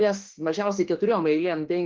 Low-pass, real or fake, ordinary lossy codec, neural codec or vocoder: 7.2 kHz; fake; Opus, 16 kbps; autoencoder, 48 kHz, 32 numbers a frame, DAC-VAE, trained on Japanese speech